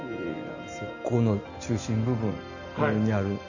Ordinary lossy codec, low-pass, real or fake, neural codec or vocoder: none; 7.2 kHz; real; none